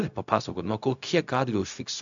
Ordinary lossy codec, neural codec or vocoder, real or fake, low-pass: AAC, 64 kbps; codec, 16 kHz, 0.4 kbps, LongCat-Audio-Codec; fake; 7.2 kHz